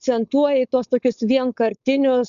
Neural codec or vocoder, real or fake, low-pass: codec, 16 kHz, 4.8 kbps, FACodec; fake; 7.2 kHz